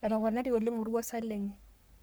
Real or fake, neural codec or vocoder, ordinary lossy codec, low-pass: fake; codec, 44.1 kHz, 3.4 kbps, Pupu-Codec; none; none